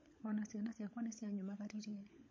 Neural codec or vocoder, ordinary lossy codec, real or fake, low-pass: codec, 16 kHz, 16 kbps, FunCodec, trained on LibriTTS, 50 frames a second; MP3, 32 kbps; fake; 7.2 kHz